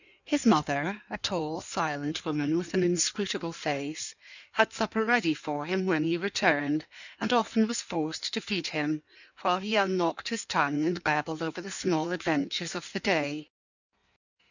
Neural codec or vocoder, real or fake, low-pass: codec, 16 kHz in and 24 kHz out, 1.1 kbps, FireRedTTS-2 codec; fake; 7.2 kHz